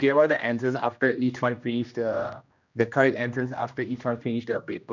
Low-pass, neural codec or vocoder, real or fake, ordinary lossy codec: 7.2 kHz; codec, 16 kHz, 1 kbps, X-Codec, HuBERT features, trained on general audio; fake; none